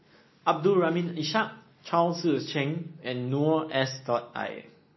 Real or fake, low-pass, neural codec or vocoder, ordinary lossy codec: real; 7.2 kHz; none; MP3, 24 kbps